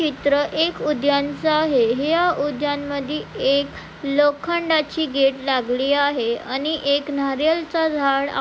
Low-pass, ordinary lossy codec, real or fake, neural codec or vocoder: none; none; real; none